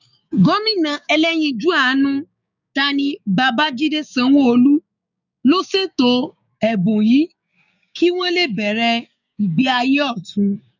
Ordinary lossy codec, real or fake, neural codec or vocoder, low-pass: none; fake; codec, 16 kHz, 6 kbps, DAC; 7.2 kHz